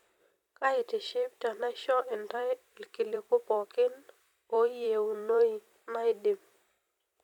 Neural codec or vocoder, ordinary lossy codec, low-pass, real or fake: vocoder, 44.1 kHz, 128 mel bands every 256 samples, BigVGAN v2; none; 19.8 kHz; fake